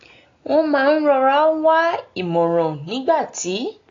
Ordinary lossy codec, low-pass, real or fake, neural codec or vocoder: AAC, 32 kbps; 7.2 kHz; real; none